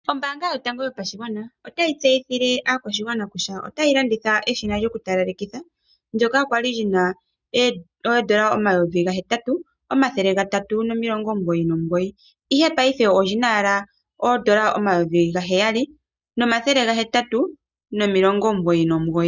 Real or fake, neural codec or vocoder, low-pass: real; none; 7.2 kHz